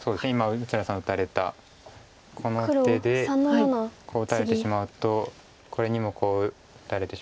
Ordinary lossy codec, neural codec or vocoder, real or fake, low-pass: none; none; real; none